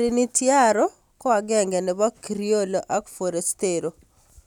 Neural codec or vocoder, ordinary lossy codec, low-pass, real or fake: none; none; 19.8 kHz; real